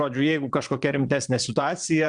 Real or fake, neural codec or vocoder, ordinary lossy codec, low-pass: fake; vocoder, 22.05 kHz, 80 mel bands, Vocos; Opus, 64 kbps; 9.9 kHz